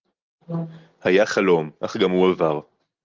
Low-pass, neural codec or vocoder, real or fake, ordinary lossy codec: 7.2 kHz; none; real; Opus, 32 kbps